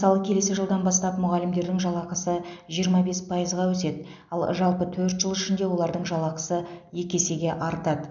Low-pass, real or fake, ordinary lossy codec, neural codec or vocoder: 7.2 kHz; real; none; none